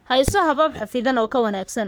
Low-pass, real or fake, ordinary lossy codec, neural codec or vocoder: none; fake; none; codec, 44.1 kHz, 3.4 kbps, Pupu-Codec